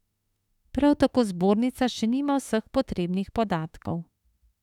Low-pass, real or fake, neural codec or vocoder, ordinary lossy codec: 19.8 kHz; fake; autoencoder, 48 kHz, 32 numbers a frame, DAC-VAE, trained on Japanese speech; none